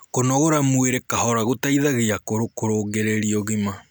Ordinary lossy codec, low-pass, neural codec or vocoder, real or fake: none; none; none; real